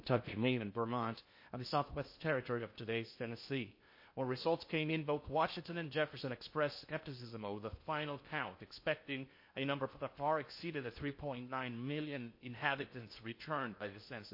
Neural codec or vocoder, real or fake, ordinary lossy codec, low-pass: codec, 16 kHz in and 24 kHz out, 0.6 kbps, FocalCodec, streaming, 2048 codes; fake; MP3, 32 kbps; 5.4 kHz